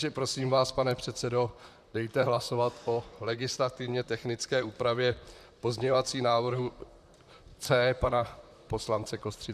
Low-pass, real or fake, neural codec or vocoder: 14.4 kHz; fake; vocoder, 44.1 kHz, 128 mel bands, Pupu-Vocoder